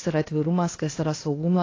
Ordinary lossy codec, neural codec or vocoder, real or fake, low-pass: AAC, 32 kbps; codec, 16 kHz, 0.8 kbps, ZipCodec; fake; 7.2 kHz